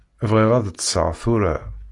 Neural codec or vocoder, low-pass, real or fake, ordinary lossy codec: none; 10.8 kHz; real; AAC, 48 kbps